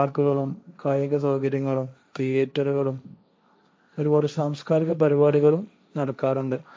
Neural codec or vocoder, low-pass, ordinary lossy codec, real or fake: codec, 16 kHz, 1.1 kbps, Voila-Tokenizer; none; none; fake